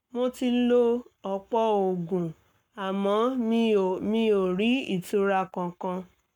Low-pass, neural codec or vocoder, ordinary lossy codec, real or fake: 19.8 kHz; codec, 44.1 kHz, 7.8 kbps, Pupu-Codec; none; fake